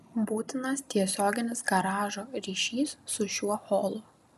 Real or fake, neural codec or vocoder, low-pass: real; none; 14.4 kHz